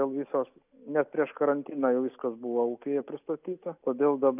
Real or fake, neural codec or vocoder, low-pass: real; none; 3.6 kHz